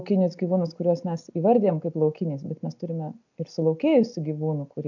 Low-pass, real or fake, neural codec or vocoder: 7.2 kHz; real; none